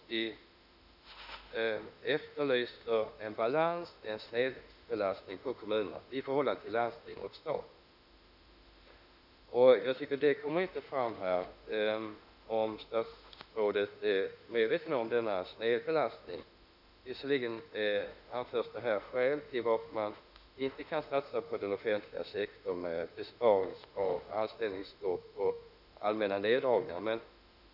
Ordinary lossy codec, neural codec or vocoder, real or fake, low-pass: none; autoencoder, 48 kHz, 32 numbers a frame, DAC-VAE, trained on Japanese speech; fake; 5.4 kHz